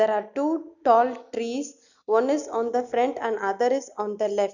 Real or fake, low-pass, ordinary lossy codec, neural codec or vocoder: real; 7.2 kHz; none; none